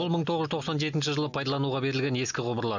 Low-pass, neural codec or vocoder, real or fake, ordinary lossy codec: 7.2 kHz; none; real; none